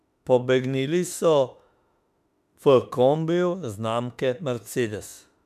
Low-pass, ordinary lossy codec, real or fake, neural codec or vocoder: 14.4 kHz; MP3, 96 kbps; fake; autoencoder, 48 kHz, 32 numbers a frame, DAC-VAE, trained on Japanese speech